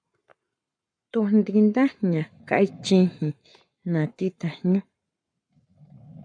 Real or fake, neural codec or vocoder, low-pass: fake; codec, 44.1 kHz, 7.8 kbps, Pupu-Codec; 9.9 kHz